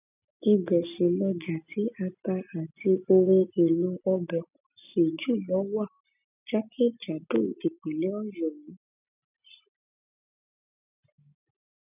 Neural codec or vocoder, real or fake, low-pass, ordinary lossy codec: none; real; 3.6 kHz; none